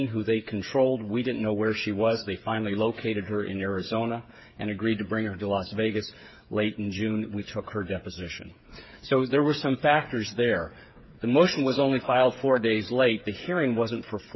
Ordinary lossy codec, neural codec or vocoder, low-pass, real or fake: MP3, 24 kbps; codec, 16 kHz, 8 kbps, FreqCodec, smaller model; 7.2 kHz; fake